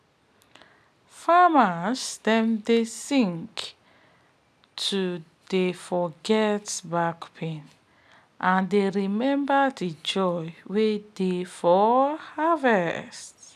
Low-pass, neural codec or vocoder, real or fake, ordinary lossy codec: 14.4 kHz; none; real; none